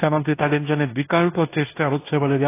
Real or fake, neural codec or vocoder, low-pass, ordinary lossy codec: fake; codec, 16 kHz in and 24 kHz out, 0.9 kbps, LongCat-Audio-Codec, fine tuned four codebook decoder; 3.6 kHz; AAC, 24 kbps